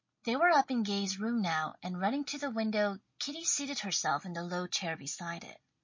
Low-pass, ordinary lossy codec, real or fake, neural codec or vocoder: 7.2 kHz; MP3, 32 kbps; real; none